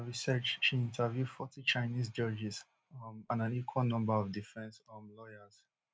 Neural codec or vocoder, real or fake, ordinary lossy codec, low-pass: none; real; none; none